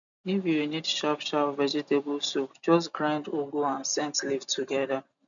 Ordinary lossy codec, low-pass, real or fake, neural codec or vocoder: none; 7.2 kHz; real; none